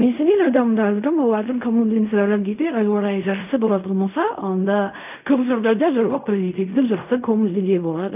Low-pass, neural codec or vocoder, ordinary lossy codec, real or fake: 3.6 kHz; codec, 16 kHz in and 24 kHz out, 0.4 kbps, LongCat-Audio-Codec, fine tuned four codebook decoder; AAC, 32 kbps; fake